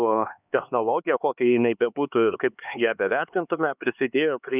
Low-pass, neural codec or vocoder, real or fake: 3.6 kHz; codec, 16 kHz, 2 kbps, X-Codec, HuBERT features, trained on LibriSpeech; fake